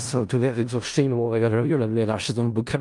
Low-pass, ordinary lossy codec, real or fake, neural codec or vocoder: 10.8 kHz; Opus, 32 kbps; fake; codec, 16 kHz in and 24 kHz out, 0.4 kbps, LongCat-Audio-Codec, four codebook decoder